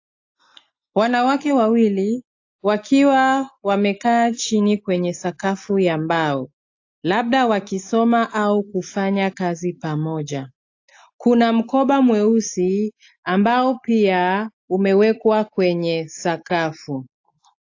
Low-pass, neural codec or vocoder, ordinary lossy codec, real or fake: 7.2 kHz; none; AAC, 48 kbps; real